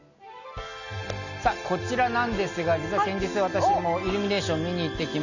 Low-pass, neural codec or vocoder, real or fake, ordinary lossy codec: 7.2 kHz; none; real; none